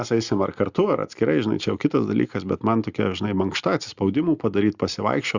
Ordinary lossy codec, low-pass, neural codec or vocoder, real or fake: Opus, 64 kbps; 7.2 kHz; none; real